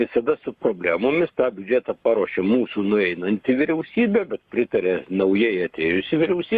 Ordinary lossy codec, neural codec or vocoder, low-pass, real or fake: Opus, 64 kbps; codec, 44.1 kHz, 7.8 kbps, Pupu-Codec; 14.4 kHz; fake